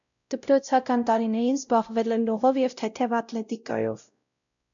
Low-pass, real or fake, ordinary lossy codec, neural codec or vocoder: 7.2 kHz; fake; MP3, 96 kbps; codec, 16 kHz, 0.5 kbps, X-Codec, WavLM features, trained on Multilingual LibriSpeech